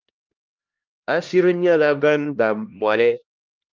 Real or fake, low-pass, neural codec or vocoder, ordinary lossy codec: fake; 7.2 kHz; codec, 16 kHz, 1 kbps, X-Codec, HuBERT features, trained on LibriSpeech; Opus, 24 kbps